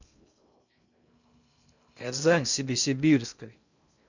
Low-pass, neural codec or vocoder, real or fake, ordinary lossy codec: 7.2 kHz; codec, 16 kHz in and 24 kHz out, 0.6 kbps, FocalCodec, streaming, 2048 codes; fake; none